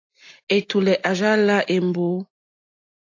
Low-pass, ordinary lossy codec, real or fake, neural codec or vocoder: 7.2 kHz; AAC, 48 kbps; real; none